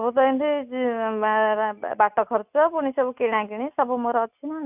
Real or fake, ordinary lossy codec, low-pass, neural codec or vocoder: real; none; 3.6 kHz; none